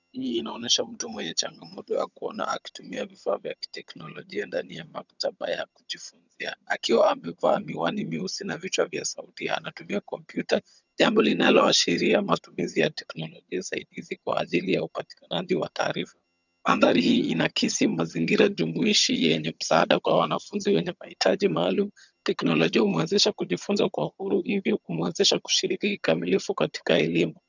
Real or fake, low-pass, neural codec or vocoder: fake; 7.2 kHz; vocoder, 22.05 kHz, 80 mel bands, HiFi-GAN